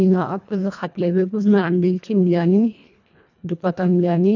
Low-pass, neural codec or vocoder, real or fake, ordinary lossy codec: 7.2 kHz; codec, 24 kHz, 1.5 kbps, HILCodec; fake; none